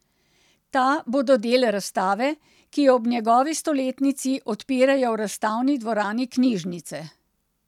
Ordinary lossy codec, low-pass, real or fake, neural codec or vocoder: none; 19.8 kHz; real; none